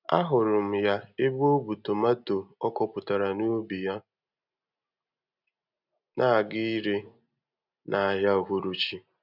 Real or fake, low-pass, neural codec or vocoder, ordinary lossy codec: real; 5.4 kHz; none; none